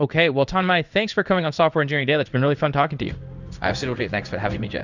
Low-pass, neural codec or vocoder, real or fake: 7.2 kHz; codec, 16 kHz in and 24 kHz out, 1 kbps, XY-Tokenizer; fake